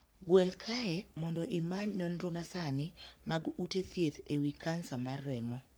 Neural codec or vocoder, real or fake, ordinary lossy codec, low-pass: codec, 44.1 kHz, 3.4 kbps, Pupu-Codec; fake; none; none